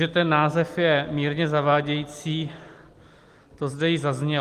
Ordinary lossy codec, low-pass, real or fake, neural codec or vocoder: Opus, 24 kbps; 14.4 kHz; real; none